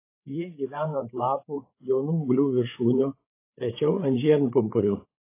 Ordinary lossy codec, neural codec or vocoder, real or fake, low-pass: AAC, 24 kbps; codec, 16 kHz, 8 kbps, FreqCodec, larger model; fake; 3.6 kHz